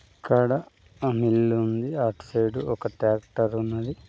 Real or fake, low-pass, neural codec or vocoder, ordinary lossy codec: real; none; none; none